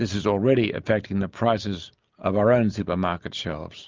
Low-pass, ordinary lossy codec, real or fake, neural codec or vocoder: 7.2 kHz; Opus, 24 kbps; real; none